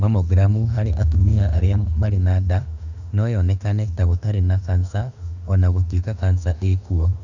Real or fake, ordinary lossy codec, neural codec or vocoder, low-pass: fake; none; autoencoder, 48 kHz, 32 numbers a frame, DAC-VAE, trained on Japanese speech; 7.2 kHz